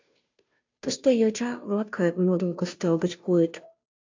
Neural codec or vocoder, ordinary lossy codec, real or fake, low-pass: codec, 16 kHz, 0.5 kbps, FunCodec, trained on Chinese and English, 25 frames a second; AAC, 48 kbps; fake; 7.2 kHz